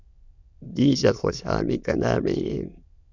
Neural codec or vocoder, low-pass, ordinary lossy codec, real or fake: autoencoder, 22.05 kHz, a latent of 192 numbers a frame, VITS, trained on many speakers; 7.2 kHz; Opus, 64 kbps; fake